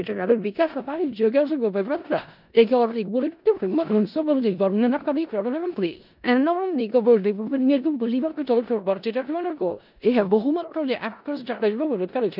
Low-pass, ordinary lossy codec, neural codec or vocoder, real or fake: 5.4 kHz; none; codec, 16 kHz in and 24 kHz out, 0.4 kbps, LongCat-Audio-Codec, four codebook decoder; fake